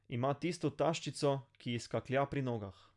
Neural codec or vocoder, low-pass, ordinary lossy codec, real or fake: none; 10.8 kHz; none; real